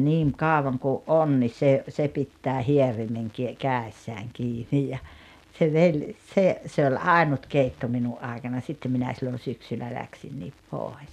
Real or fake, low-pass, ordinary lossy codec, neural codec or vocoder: real; 14.4 kHz; none; none